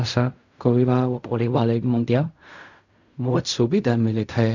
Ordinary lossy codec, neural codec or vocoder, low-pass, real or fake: none; codec, 16 kHz in and 24 kHz out, 0.4 kbps, LongCat-Audio-Codec, fine tuned four codebook decoder; 7.2 kHz; fake